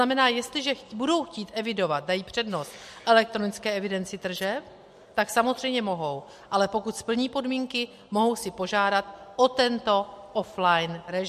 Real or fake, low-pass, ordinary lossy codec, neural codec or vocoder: real; 14.4 kHz; MP3, 64 kbps; none